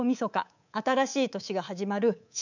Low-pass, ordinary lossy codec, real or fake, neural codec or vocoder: 7.2 kHz; none; fake; codec, 24 kHz, 3.1 kbps, DualCodec